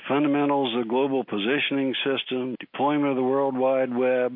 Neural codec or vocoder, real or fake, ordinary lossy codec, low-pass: none; real; MP3, 32 kbps; 5.4 kHz